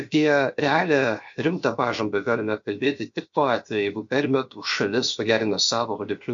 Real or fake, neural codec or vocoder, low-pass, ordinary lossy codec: fake; codec, 16 kHz, 0.7 kbps, FocalCodec; 7.2 kHz; MP3, 48 kbps